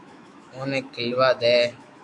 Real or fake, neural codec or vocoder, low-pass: fake; autoencoder, 48 kHz, 128 numbers a frame, DAC-VAE, trained on Japanese speech; 10.8 kHz